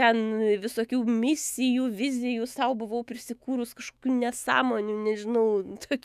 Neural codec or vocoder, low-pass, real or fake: autoencoder, 48 kHz, 128 numbers a frame, DAC-VAE, trained on Japanese speech; 14.4 kHz; fake